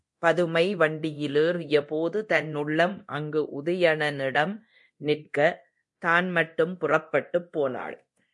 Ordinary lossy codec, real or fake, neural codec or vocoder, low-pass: MP3, 48 kbps; fake; codec, 24 kHz, 0.9 kbps, DualCodec; 10.8 kHz